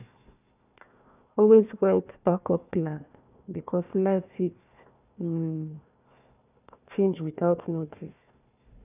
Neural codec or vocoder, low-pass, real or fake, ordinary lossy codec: codec, 16 kHz, 1 kbps, FunCodec, trained on Chinese and English, 50 frames a second; 3.6 kHz; fake; none